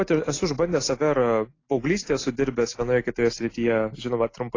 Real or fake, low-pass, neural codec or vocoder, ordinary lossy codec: real; 7.2 kHz; none; AAC, 32 kbps